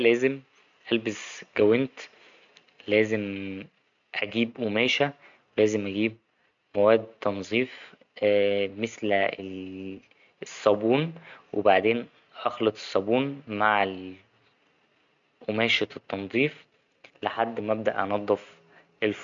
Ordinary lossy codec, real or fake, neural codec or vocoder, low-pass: MP3, 64 kbps; real; none; 7.2 kHz